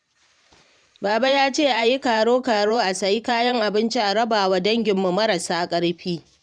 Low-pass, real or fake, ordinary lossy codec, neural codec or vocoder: 9.9 kHz; fake; none; vocoder, 44.1 kHz, 128 mel bands every 512 samples, BigVGAN v2